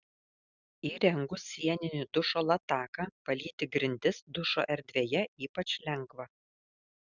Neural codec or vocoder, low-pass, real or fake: none; 7.2 kHz; real